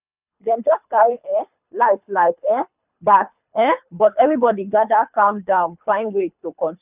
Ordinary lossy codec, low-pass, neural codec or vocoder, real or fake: none; 3.6 kHz; codec, 24 kHz, 3 kbps, HILCodec; fake